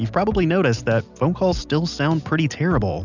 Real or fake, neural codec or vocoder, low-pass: real; none; 7.2 kHz